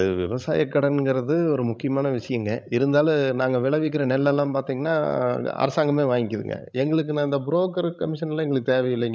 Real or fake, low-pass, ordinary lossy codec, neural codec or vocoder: fake; none; none; codec, 16 kHz, 16 kbps, FreqCodec, larger model